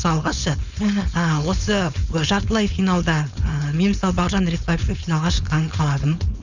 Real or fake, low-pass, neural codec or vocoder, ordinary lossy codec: fake; 7.2 kHz; codec, 16 kHz, 4.8 kbps, FACodec; none